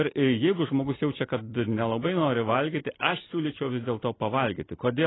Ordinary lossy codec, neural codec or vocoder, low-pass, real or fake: AAC, 16 kbps; none; 7.2 kHz; real